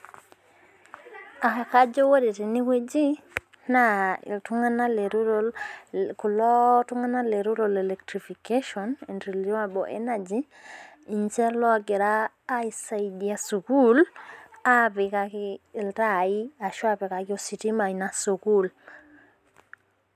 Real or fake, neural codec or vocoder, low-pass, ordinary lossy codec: real; none; 14.4 kHz; none